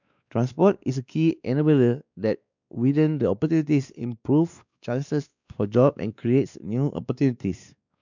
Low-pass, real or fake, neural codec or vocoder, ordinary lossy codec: 7.2 kHz; fake; codec, 16 kHz, 2 kbps, X-Codec, WavLM features, trained on Multilingual LibriSpeech; none